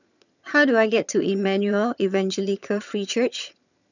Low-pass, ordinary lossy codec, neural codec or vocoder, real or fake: 7.2 kHz; none; vocoder, 22.05 kHz, 80 mel bands, HiFi-GAN; fake